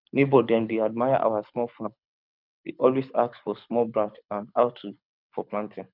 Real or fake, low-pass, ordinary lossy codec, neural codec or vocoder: fake; 5.4 kHz; none; codec, 24 kHz, 6 kbps, HILCodec